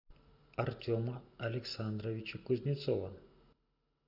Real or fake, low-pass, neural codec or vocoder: real; 5.4 kHz; none